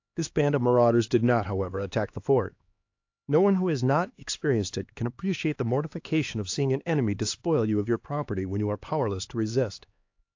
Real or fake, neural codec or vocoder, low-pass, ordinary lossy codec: fake; codec, 16 kHz, 2 kbps, X-Codec, HuBERT features, trained on LibriSpeech; 7.2 kHz; AAC, 48 kbps